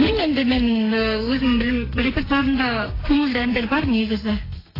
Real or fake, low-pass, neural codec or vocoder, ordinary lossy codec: fake; 5.4 kHz; codec, 32 kHz, 1.9 kbps, SNAC; AAC, 24 kbps